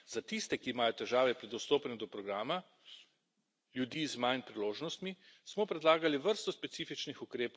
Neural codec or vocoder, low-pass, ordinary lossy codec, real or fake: none; none; none; real